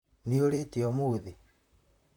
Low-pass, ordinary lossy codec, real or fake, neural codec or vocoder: 19.8 kHz; none; fake; vocoder, 44.1 kHz, 128 mel bands, Pupu-Vocoder